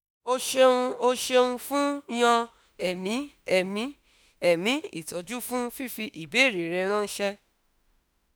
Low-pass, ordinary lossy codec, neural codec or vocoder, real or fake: none; none; autoencoder, 48 kHz, 32 numbers a frame, DAC-VAE, trained on Japanese speech; fake